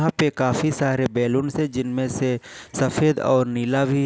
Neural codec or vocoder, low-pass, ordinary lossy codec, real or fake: none; none; none; real